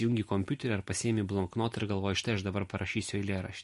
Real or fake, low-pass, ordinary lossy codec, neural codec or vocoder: real; 14.4 kHz; MP3, 48 kbps; none